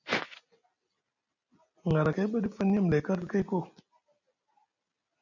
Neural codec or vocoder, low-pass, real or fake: none; 7.2 kHz; real